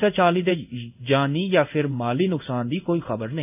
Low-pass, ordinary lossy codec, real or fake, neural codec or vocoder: 3.6 kHz; none; fake; codec, 16 kHz in and 24 kHz out, 1 kbps, XY-Tokenizer